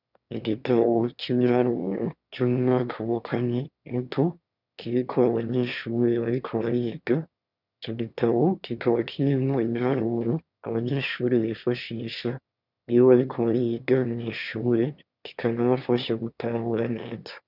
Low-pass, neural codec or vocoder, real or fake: 5.4 kHz; autoencoder, 22.05 kHz, a latent of 192 numbers a frame, VITS, trained on one speaker; fake